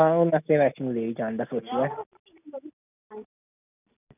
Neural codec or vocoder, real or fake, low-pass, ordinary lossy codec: none; real; 3.6 kHz; none